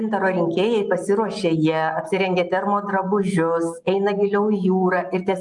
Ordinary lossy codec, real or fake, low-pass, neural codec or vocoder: Opus, 32 kbps; real; 10.8 kHz; none